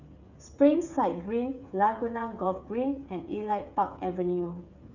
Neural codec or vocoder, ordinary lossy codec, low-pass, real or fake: codec, 16 kHz, 8 kbps, FreqCodec, smaller model; none; 7.2 kHz; fake